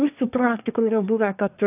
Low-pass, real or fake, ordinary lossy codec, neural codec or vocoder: 3.6 kHz; fake; AAC, 32 kbps; codec, 24 kHz, 1 kbps, SNAC